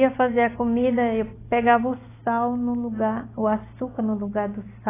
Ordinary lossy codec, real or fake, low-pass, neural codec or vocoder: AAC, 16 kbps; real; 3.6 kHz; none